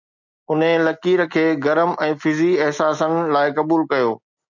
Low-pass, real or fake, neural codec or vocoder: 7.2 kHz; real; none